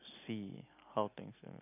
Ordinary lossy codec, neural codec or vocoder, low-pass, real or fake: none; none; 3.6 kHz; real